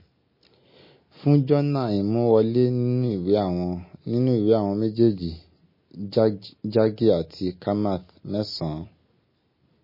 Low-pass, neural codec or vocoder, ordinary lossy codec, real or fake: 5.4 kHz; none; MP3, 24 kbps; real